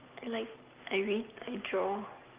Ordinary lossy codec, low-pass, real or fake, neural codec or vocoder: Opus, 32 kbps; 3.6 kHz; real; none